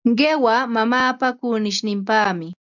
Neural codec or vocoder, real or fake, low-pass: none; real; 7.2 kHz